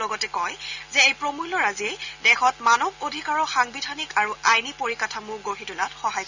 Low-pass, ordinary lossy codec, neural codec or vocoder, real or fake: 7.2 kHz; Opus, 64 kbps; none; real